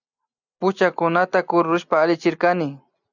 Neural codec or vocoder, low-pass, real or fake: none; 7.2 kHz; real